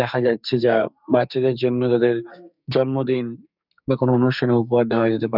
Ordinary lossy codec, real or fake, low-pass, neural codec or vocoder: none; fake; 5.4 kHz; codec, 44.1 kHz, 2.6 kbps, SNAC